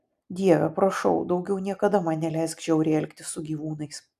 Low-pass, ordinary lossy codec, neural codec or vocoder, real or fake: 14.4 kHz; AAC, 96 kbps; none; real